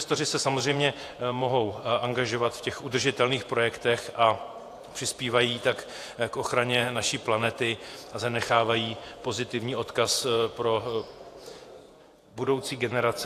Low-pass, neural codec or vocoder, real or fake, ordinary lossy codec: 14.4 kHz; vocoder, 48 kHz, 128 mel bands, Vocos; fake; AAC, 64 kbps